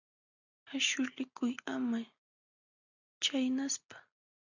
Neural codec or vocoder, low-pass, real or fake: none; 7.2 kHz; real